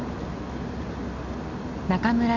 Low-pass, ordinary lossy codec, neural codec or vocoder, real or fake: 7.2 kHz; none; none; real